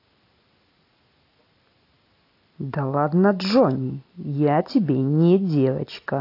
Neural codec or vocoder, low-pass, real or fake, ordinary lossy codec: none; 5.4 kHz; real; AAC, 32 kbps